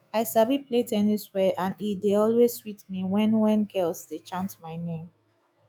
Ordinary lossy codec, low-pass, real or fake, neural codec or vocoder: none; none; fake; autoencoder, 48 kHz, 128 numbers a frame, DAC-VAE, trained on Japanese speech